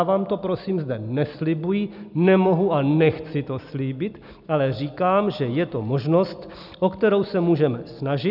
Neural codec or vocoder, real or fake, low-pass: none; real; 5.4 kHz